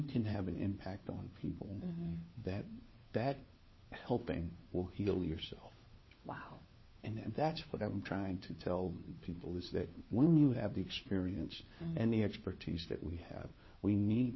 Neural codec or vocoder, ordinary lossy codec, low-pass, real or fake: codec, 16 kHz, 4 kbps, FunCodec, trained on LibriTTS, 50 frames a second; MP3, 24 kbps; 7.2 kHz; fake